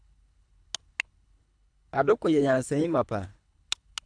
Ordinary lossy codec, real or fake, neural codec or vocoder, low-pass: AAC, 64 kbps; fake; codec, 24 kHz, 3 kbps, HILCodec; 9.9 kHz